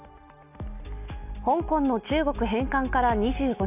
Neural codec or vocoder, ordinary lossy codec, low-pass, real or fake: none; none; 3.6 kHz; real